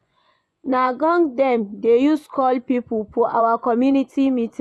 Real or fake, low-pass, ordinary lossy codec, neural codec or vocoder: fake; none; none; vocoder, 24 kHz, 100 mel bands, Vocos